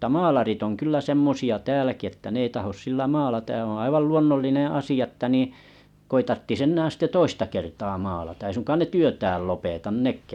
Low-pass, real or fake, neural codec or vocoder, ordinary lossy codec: 19.8 kHz; real; none; none